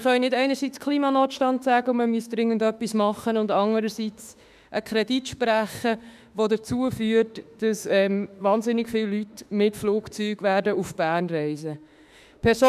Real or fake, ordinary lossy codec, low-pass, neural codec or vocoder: fake; none; 14.4 kHz; autoencoder, 48 kHz, 32 numbers a frame, DAC-VAE, trained on Japanese speech